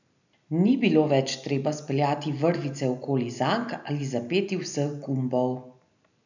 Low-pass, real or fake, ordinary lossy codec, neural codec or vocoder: 7.2 kHz; real; none; none